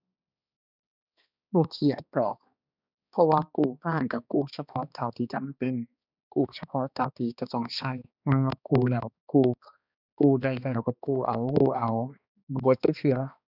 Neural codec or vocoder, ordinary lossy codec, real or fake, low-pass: codec, 16 kHz, 2 kbps, X-Codec, HuBERT features, trained on balanced general audio; none; fake; 5.4 kHz